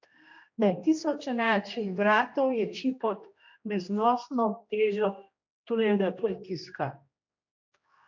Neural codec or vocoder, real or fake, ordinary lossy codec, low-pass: codec, 16 kHz, 1 kbps, X-Codec, HuBERT features, trained on general audio; fake; MP3, 48 kbps; 7.2 kHz